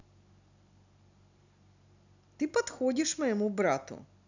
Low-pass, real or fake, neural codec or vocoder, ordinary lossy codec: 7.2 kHz; real; none; none